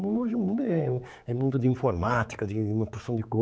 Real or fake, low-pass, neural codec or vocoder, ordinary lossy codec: fake; none; codec, 16 kHz, 4 kbps, X-Codec, HuBERT features, trained on general audio; none